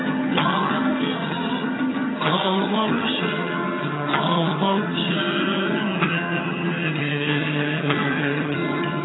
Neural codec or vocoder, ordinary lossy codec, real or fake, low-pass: vocoder, 22.05 kHz, 80 mel bands, HiFi-GAN; AAC, 16 kbps; fake; 7.2 kHz